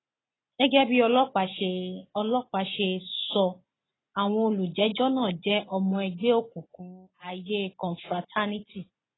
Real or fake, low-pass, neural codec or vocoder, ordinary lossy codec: real; 7.2 kHz; none; AAC, 16 kbps